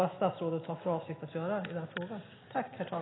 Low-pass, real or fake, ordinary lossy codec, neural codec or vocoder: 7.2 kHz; real; AAC, 16 kbps; none